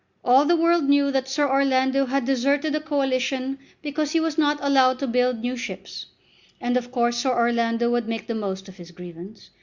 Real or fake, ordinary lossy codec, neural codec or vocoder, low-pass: real; Opus, 64 kbps; none; 7.2 kHz